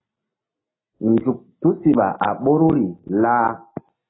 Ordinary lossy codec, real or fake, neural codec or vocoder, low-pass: AAC, 16 kbps; real; none; 7.2 kHz